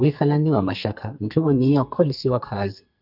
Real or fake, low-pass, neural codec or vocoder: fake; 5.4 kHz; codec, 44.1 kHz, 2.6 kbps, SNAC